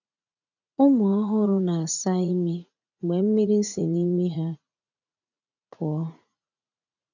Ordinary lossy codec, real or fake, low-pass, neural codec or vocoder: none; fake; 7.2 kHz; vocoder, 44.1 kHz, 80 mel bands, Vocos